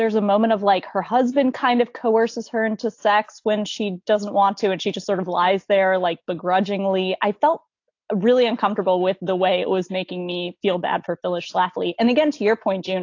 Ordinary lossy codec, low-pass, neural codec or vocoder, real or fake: AAC, 48 kbps; 7.2 kHz; none; real